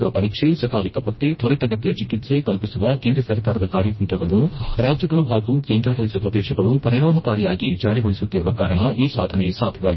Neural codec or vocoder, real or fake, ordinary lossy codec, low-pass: codec, 16 kHz, 1 kbps, FreqCodec, smaller model; fake; MP3, 24 kbps; 7.2 kHz